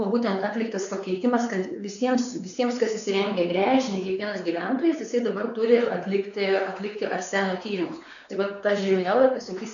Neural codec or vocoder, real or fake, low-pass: codec, 16 kHz, 4 kbps, X-Codec, WavLM features, trained on Multilingual LibriSpeech; fake; 7.2 kHz